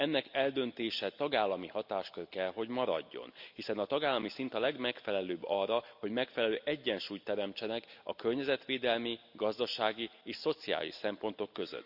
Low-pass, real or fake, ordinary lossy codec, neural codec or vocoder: 5.4 kHz; real; none; none